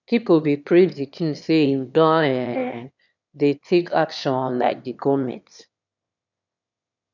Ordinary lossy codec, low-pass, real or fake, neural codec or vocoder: none; 7.2 kHz; fake; autoencoder, 22.05 kHz, a latent of 192 numbers a frame, VITS, trained on one speaker